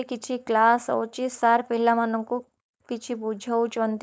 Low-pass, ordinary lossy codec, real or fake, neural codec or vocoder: none; none; fake; codec, 16 kHz, 4.8 kbps, FACodec